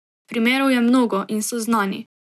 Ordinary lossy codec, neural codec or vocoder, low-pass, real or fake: none; none; 14.4 kHz; real